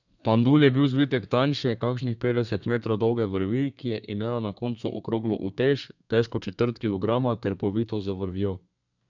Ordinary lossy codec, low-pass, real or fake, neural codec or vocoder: none; 7.2 kHz; fake; codec, 32 kHz, 1.9 kbps, SNAC